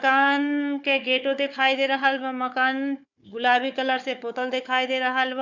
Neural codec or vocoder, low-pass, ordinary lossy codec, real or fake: codec, 16 kHz, 4 kbps, FunCodec, trained on Chinese and English, 50 frames a second; 7.2 kHz; none; fake